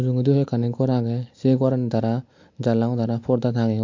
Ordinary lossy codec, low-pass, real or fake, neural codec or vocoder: MP3, 48 kbps; 7.2 kHz; real; none